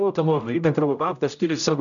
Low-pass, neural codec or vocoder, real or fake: 7.2 kHz; codec, 16 kHz, 0.5 kbps, X-Codec, HuBERT features, trained on general audio; fake